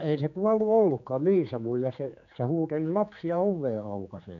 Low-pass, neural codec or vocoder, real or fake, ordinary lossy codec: 7.2 kHz; codec, 16 kHz, 4 kbps, X-Codec, HuBERT features, trained on general audio; fake; none